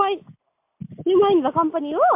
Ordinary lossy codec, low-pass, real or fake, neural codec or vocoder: MP3, 32 kbps; 3.6 kHz; real; none